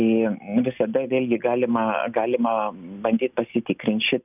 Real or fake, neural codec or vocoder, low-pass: real; none; 3.6 kHz